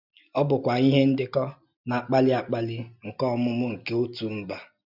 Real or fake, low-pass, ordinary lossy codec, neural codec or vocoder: real; 5.4 kHz; none; none